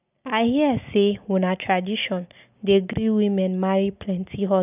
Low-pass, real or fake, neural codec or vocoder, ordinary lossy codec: 3.6 kHz; real; none; none